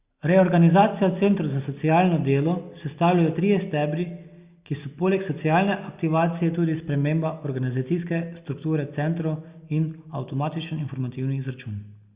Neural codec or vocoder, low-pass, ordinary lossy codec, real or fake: none; 3.6 kHz; Opus, 64 kbps; real